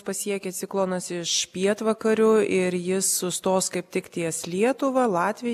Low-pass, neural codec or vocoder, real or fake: 14.4 kHz; none; real